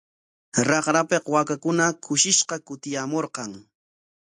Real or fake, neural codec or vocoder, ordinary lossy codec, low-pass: real; none; AAC, 64 kbps; 10.8 kHz